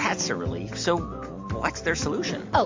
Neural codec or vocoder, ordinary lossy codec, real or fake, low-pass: none; MP3, 48 kbps; real; 7.2 kHz